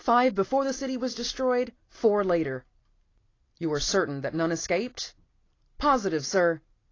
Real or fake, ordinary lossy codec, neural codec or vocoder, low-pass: real; AAC, 32 kbps; none; 7.2 kHz